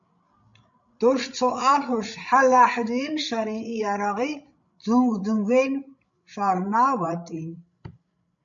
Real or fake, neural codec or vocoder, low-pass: fake; codec, 16 kHz, 16 kbps, FreqCodec, larger model; 7.2 kHz